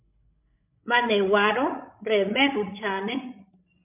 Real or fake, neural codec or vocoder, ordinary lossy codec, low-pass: fake; codec, 16 kHz, 16 kbps, FreqCodec, larger model; MP3, 32 kbps; 3.6 kHz